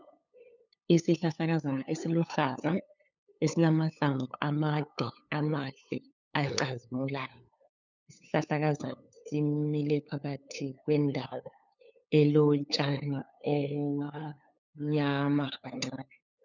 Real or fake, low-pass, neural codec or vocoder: fake; 7.2 kHz; codec, 16 kHz, 8 kbps, FunCodec, trained on LibriTTS, 25 frames a second